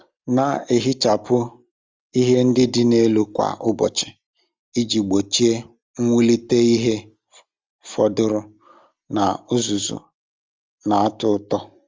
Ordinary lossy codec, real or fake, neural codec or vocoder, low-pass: Opus, 32 kbps; real; none; 7.2 kHz